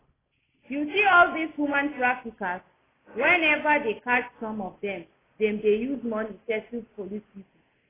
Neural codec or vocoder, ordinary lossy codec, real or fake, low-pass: none; AAC, 16 kbps; real; 3.6 kHz